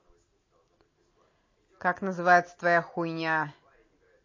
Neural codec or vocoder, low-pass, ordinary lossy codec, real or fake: none; 7.2 kHz; MP3, 32 kbps; real